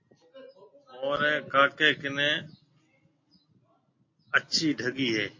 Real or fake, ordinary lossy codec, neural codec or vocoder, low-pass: real; MP3, 32 kbps; none; 7.2 kHz